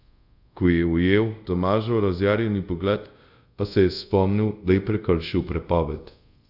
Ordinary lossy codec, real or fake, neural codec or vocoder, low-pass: none; fake; codec, 24 kHz, 0.5 kbps, DualCodec; 5.4 kHz